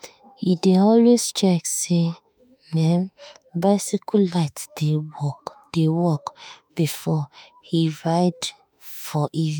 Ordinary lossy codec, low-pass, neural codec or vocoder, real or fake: none; none; autoencoder, 48 kHz, 32 numbers a frame, DAC-VAE, trained on Japanese speech; fake